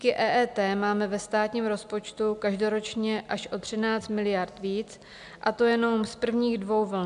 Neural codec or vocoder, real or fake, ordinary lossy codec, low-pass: none; real; MP3, 96 kbps; 10.8 kHz